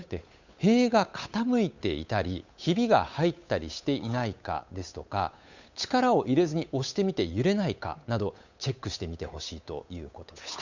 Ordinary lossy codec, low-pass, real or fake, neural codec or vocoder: none; 7.2 kHz; fake; codec, 16 kHz, 8 kbps, FunCodec, trained on Chinese and English, 25 frames a second